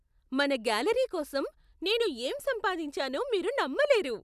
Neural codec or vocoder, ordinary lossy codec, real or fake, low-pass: none; none; real; 14.4 kHz